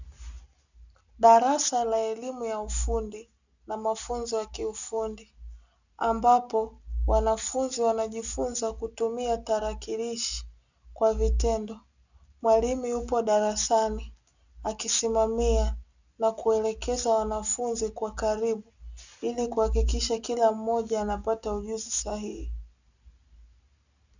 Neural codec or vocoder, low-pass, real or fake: none; 7.2 kHz; real